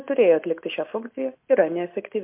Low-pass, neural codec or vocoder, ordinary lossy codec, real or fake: 3.6 kHz; none; MP3, 32 kbps; real